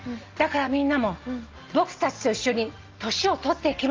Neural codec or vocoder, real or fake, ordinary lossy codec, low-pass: none; real; Opus, 32 kbps; 7.2 kHz